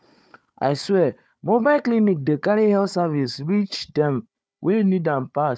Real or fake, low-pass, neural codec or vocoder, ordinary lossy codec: fake; none; codec, 16 kHz, 4 kbps, FunCodec, trained on Chinese and English, 50 frames a second; none